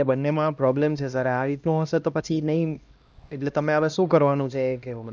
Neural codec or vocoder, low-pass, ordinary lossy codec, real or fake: codec, 16 kHz, 1 kbps, X-Codec, HuBERT features, trained on LibriSpeech; none; none; fake